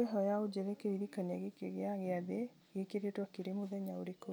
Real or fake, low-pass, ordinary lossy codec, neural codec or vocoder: real; none; none; none